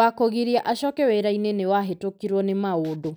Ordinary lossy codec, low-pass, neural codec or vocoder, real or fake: none; none; none; real